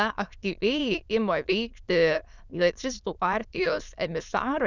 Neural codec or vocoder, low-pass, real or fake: autoencoder, 22.05 kHz, a latent of 192 numbers a frame, VITS, trained on many speakers; 7.2 kHz; fake